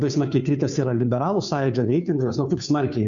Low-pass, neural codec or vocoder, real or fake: 7.2 kHz; codec, 16 kHz, 2 kbps, FunCodec, trained on Chinese and English, 25 frames a second; fake